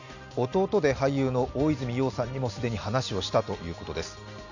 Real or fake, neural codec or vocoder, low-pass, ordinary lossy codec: real; none; 7.2 kHz; none